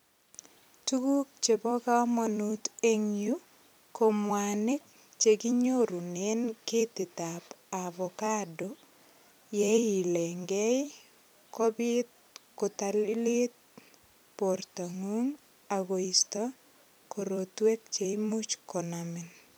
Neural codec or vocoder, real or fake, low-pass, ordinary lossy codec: vocoder, 44.1 kHz, 128 mel bands every 256 samples, BigVGAN v2; fake; none; none